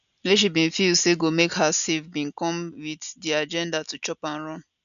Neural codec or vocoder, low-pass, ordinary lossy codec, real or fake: none; 7.2 kHz; none; real